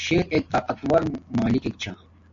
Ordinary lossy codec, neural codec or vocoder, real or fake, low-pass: MP3, 48 kbps; none; real; 7.2 kHz